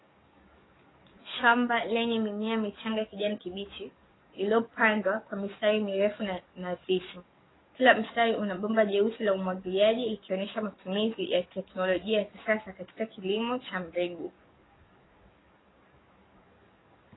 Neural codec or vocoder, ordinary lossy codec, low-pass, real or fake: codec, 44.1 kHz, 7.8 kbps, DAC; AAC, 16 kbps; 7.2 kHz; fake